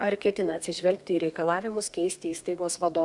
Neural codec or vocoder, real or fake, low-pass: codec, 32 kHz, 1.9 kbps, SNAC; fake; 10.8 kHz